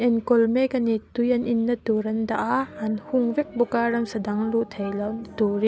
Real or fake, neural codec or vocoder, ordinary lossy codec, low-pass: real; none; none; none